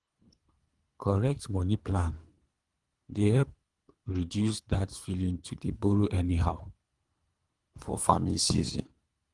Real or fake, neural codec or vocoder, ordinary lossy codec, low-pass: fake; codec, 24 kHz, 3 kbps, HILCodec; Opus, 24 kbps; 10.8 kHz